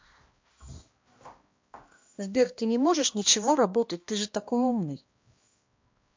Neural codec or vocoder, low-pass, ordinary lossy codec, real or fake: codec, 16 kHz, 1 kbps, X-Codec, HuBERT features, trained on balanced general audio; 7.2 kHz; MP3, 48 kbps; fake